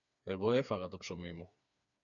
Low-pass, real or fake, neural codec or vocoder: 7.2 kHz; fake; codec, 16 kHz, 4 kbps, FreqCodec, smaller model